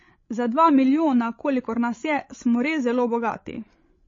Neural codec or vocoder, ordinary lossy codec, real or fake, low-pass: codec, 16 kHz, 16 kbps, FreqCodec, larger model; MP3, 32 kbps; fake; 7.2 kHz